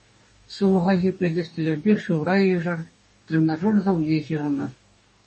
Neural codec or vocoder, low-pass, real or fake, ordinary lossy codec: codec, 44.1 kHz, 2.6 kbps, DAC; 10.8 kHz; fake; MP3, 32 kbps